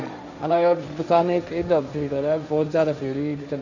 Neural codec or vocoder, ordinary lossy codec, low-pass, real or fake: codec, 16 kHz, 1.1 kbps, Voila-Tokenizer; none; 7.2 kHz; fake